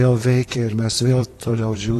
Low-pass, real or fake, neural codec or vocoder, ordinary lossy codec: 14.4 kHz; fake; vocoder, 44.1 kHz, 128 mel bands, Pupu-Vocoder; AAC, 48 kbps